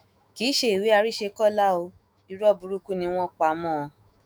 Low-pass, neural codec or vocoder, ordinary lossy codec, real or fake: none; autoencoder, 48 kHz, 128 numbers a frame, DAC-VAE, trained on Japanese speech; none; fake